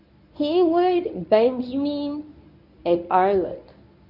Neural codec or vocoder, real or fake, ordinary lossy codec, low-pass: codec, 24 kHz, 0.9 kbps, WavTokenizer, medium speech release version 1; fake; none; 5.4 kHz